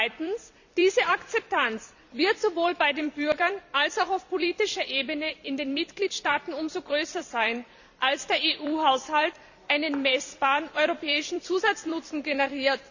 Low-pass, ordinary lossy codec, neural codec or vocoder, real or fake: 7.2 kHz; none; vocoder, 44.1 kHz, 128 mel bands every 256 samples, BigVGAN v2; fake